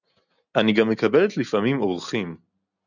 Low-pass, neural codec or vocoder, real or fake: 7.2 kHz; none; real